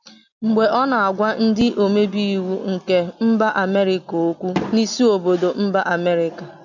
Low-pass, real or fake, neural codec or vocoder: 7.2 kHz; real; none